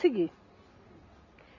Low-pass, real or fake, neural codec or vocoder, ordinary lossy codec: 7.2 kHz; real; none; none